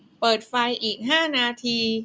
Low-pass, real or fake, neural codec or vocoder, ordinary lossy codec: none; real; none; none